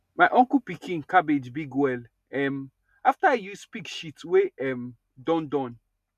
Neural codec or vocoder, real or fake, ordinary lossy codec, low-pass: none; real; none; 14.4 kHz